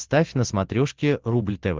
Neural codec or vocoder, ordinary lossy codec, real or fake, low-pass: none; Opus, 16 kbps; real; 7.2 kHz